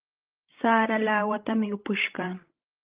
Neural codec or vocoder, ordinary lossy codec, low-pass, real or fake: codec, 16 kHz, 16 kbps, FreqCodec, larger model; Opus, 32 kbps; 3.6 kHz; fake